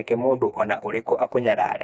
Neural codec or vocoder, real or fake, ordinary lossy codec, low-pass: codec, 16 kHz, 2 kbps, FreqCodec, smaller model; fake; none; none